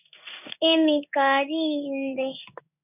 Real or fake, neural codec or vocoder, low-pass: fake; codec, 16 kHz in and 24 kHz out, 1 kbps, XY-Tokenizer; 3.6 kHz